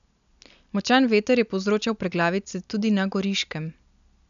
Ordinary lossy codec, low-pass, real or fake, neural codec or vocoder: none; 7.2 kHz; real; none